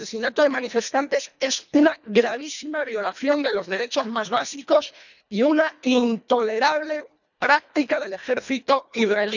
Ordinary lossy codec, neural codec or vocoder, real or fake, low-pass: none; codec, 24 kHz, 1.5 kbps, HILCodec; fake; 7.2 kHz